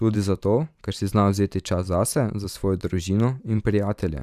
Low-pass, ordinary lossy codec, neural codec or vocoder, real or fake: 14.4 kHz; none; vocoder, 44.1 kHz, 128 mel bands every 512 samples, BigVGAN v2; fake